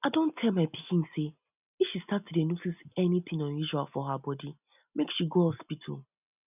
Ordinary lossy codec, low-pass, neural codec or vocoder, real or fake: none; 3.6 kHz; none; real